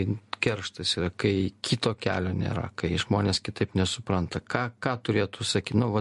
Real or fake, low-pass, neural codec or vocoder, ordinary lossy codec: real; 14.4 kHz; none; MP3, 48 kbps